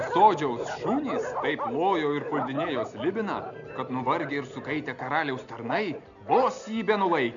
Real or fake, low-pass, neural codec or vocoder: real; 7.2 kHz; none